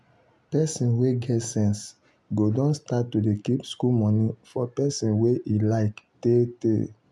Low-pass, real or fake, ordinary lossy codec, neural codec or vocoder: none; real; none; none